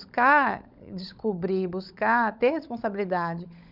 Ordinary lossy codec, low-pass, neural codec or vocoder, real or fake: none; 5.4 kHz; codec, 16 kHz, 16 kbps, FunCodec, trained on LibriTTS, 50 frames a second; fake